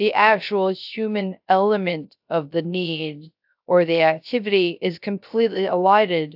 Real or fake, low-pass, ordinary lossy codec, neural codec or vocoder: fake; 5.4 kHz; AAC, 48 kbps; codec, 16 kHz, 0.3 kbps, FocalCodec